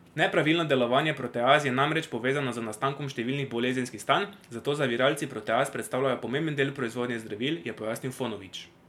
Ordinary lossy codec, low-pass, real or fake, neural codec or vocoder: MP3, 96 kbps; 19.8 kHz; real; none